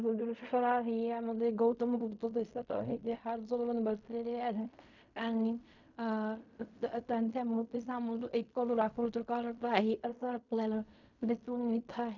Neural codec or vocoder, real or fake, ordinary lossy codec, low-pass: codec, 16 kHz in and 24 kHz out, 0.4 kbps, LongCat-Audio-Codec, fine tuned four codebook decoder; fake; none; 7.2 kHz